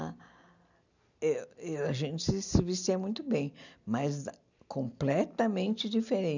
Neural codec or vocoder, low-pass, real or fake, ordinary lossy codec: none; 7.2 kHz; real; none